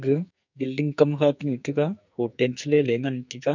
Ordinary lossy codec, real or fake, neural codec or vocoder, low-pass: none; fake; codec, 44.1 kHz, 2.6 kbps, SNAC; 7.2 kHz